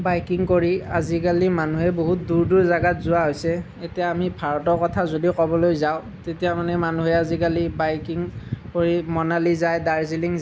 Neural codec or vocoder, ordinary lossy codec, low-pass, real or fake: none; none; none; real